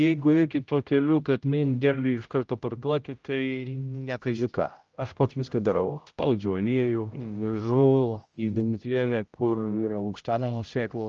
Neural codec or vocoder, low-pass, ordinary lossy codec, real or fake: codec, 16 kHz, 0.5 kbps, X-Codec, HuBERT features, trained on general audio; 7.2 kHz; Opus, 24 kbps; fake